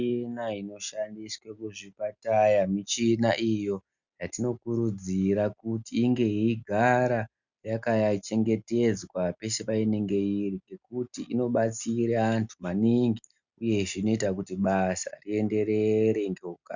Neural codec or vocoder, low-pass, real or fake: none; 7.2 kHz; real